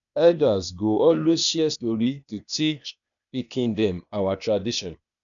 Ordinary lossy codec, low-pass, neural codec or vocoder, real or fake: none; 7.2 kHz; codec, 16 kHz, 0.8 kbps, ZipCodec; fake